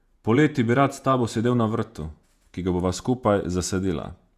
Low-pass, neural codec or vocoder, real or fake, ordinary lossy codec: 14.4 kHz; vocoder, 48 kHz, 128 mel bands, Vocos; fake; Opus, 64 kbps